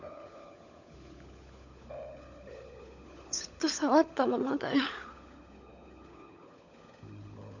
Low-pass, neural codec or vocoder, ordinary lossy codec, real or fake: 7.2 kHz; codec, 16 kHz, 16 kbps, FunCodec, trained on LibriTTS, 50 frames a second; none; fake